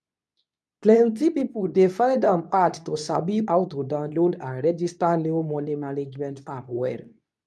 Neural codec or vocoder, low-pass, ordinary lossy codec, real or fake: codec, 24 kHz, 0.9 kbps, WavTokenizer, medium speech release version 2; none; none; fake